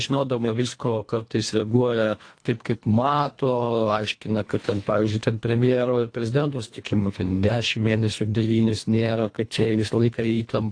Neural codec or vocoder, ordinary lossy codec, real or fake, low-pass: codec, 24 kHz, 1.5 kbps, HILCodec; AAC, 48 kbps; fake; 9.9 kHz